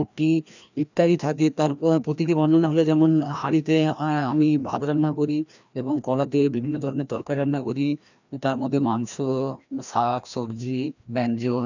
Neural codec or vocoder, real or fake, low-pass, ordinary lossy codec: codec, 16 kHz, 1 kbps, FreqCodec, larger model; fake; 7.2 kHz; none